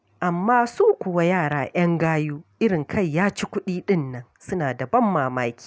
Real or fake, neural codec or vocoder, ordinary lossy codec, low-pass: real; none; none; none